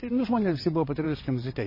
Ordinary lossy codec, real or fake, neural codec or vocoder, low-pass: MP3, 24 kbps; fake; vocoder, 22.05 kHz, 80 mel bands, WaveNeXt; 5.4 kHz